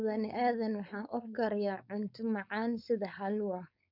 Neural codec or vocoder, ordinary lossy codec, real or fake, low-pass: codec, 16 kHz, 4.8 kbps, FACodec; none; fake; 5.4 kHz